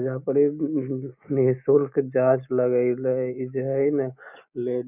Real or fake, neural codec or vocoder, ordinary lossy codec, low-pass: real; none; none; 3.6 kHz